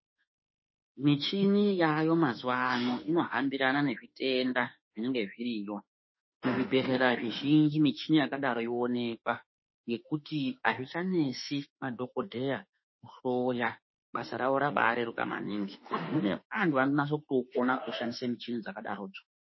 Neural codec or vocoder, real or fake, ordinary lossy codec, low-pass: autoencoder, 48 kHz, 32 numbers a frame, DAC-VAE, trained on Japanese speech; fake; MP3, 24 kbps; 7.2 kHz